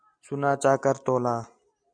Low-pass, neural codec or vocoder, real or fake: 9.9 kHz; none; real